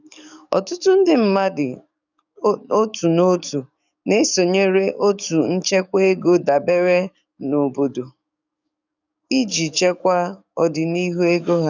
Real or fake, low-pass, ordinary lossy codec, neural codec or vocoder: fake; 7.2 kHz; none; codec, 44.1 kHz, 7.8 kbps, DAC